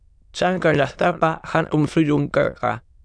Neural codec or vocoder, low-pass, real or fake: autoencoder, 22.05 kHz, a latent of 192 numbers a frame, VITS, trained on many speakers; 9.9 kHz; fake